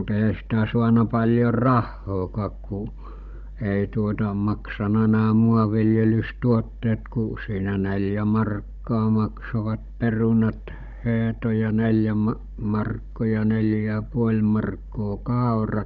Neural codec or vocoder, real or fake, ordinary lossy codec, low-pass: codec, 16 kHz, 16 kbps, FunCodec, trained on Chinese and English, 50 frames a second; fake; none; 7.2 kHz